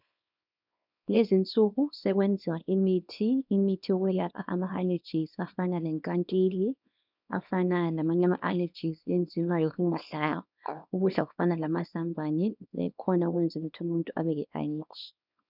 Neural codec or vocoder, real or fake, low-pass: codec, 24 kHz, 0.9 kbps, WavTokenizer, small release; fake; 5.4 kHz